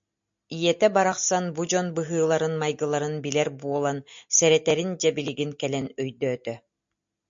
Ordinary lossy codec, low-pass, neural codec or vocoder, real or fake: MP3, 48 kbps; 7.2 kHz; none; real